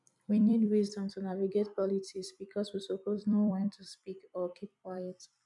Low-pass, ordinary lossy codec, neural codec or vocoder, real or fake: 10.8 kHz; none; vocoder, 44.1 kHz, 128 mel bands every 256 samples, BigVGAN v2; fake